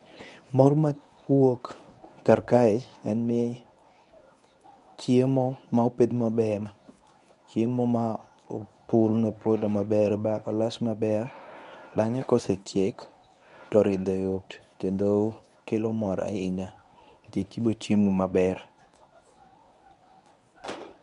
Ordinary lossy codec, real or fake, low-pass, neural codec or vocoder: none; fake; 10.8 kHz; codec, 24 kHz, 0.9 kbps, WavTokenizer, medium speech release version 1